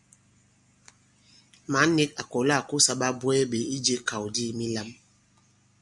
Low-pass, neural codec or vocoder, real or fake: 10.8 kHz; none; real